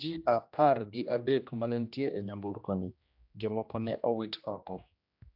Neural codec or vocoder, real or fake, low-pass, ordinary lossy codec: codec, 16 kHz, 1 kbps, X-Codec, HuBERT features, trained on general audio; fake; 5.4 kHz; AAC, 48 kbps